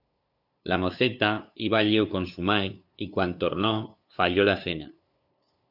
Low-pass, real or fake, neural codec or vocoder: 5.4 kHz; fake; codec, 16 kHz, 2 kbps, FunCodec, trained on LibriTTS, 25 frames a second